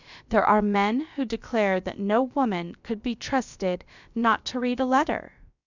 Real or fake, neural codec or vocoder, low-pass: fake; codec, 16 kHz, about 1 kbps, DyCAST, with the encoder's durations; 7.2 kHz